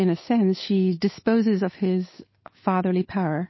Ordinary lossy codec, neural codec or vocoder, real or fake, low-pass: MP3, 24 kbps; codec, 16 kHz, 8 kbps, FunCodec, trained on Chinese and English, 25 frames a second; fake; 7.2 kHz